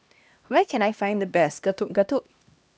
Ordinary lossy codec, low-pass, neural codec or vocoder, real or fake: none; none; codec, 16 kHz, 1 kbps, X-Codec, HuBERT features, trained on LibriSpeech; fake